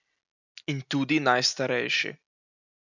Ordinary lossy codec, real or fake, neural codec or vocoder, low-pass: none; real; none; 7.2 kHz